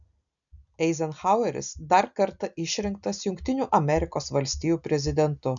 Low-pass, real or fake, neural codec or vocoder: 7.2 kHz; real; none